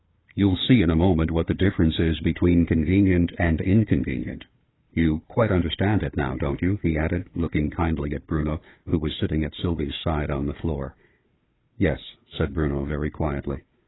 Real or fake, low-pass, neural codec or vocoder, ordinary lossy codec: fake; 7.2 kHz; codec, 16 kHz, 4 kbps, FunCodec, trained on Chinese and English, 50 frames a second; AAC, 16 kbps